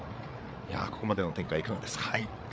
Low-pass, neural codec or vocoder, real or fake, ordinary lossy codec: none; codec, 16 kHz, 8 kbps, FreqCodec, larger model; fake; none